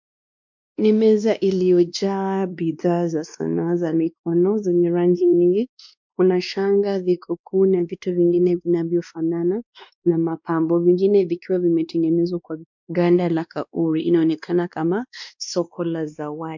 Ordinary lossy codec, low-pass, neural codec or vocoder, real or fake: MP3, 64 kbps; 7.2 kHz; codec, 16 kHz, 2 kbps, X-Codec, WavLM features, trained on Multilingual LibriSpeech; fake